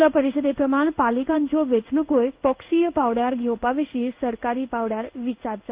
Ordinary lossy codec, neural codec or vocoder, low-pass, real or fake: Opus, 32 kbps; codec, 16 kHz in and 24 kHz out, 1 kbps, XY-Tokenizer; 3.6 kHz; fake